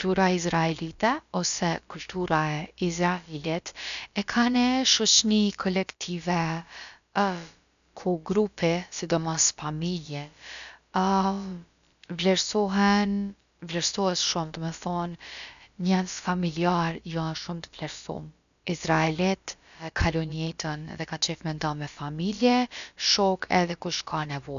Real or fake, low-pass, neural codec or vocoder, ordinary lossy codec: fake; 7.2 kHz; codec, 16 kHz, about 1 kbps, DyCAST, with the encoder's durations; none